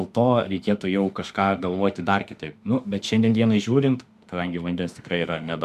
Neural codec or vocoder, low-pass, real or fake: autoencoder, 48 kHz, 32 numbers a frame, DAC-VAE, trained on Japanese speech; 14.4 kHz; fake